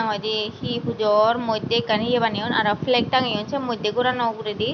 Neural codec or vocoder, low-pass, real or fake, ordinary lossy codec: none; 7.2 kHz; real; none